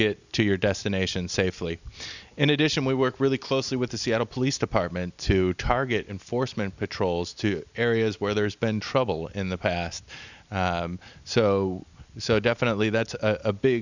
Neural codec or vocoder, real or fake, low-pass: none; real; 7.2 kHz